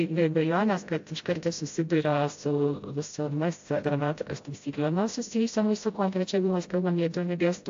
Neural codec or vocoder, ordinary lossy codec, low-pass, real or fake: codec, 16 kHz, 0.5 kbps, FreqCodec, smaller model; AAC, 48 kbps; 7.2 kHz; fake